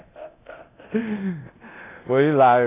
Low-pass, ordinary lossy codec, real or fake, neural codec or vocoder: 3.6 kHz; AAC, 24 kbps; fake; codec, 24 kHz, 0.9 kbps, DualCodec